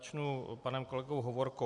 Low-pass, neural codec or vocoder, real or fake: 10.8 kHz; vocoder, 44.1 kHz, 128 mel bands every 256 samples, BigVGAN v2; fake